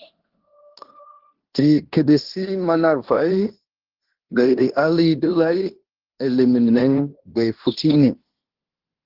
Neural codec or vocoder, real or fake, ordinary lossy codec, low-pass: codec, 16 kHz in and 24 kHz out, 0.9 kbps, LongCat-Audio-Codec, fine tuned four codebook decoder; fake; Opus, 16 kbps; 5.4 kHz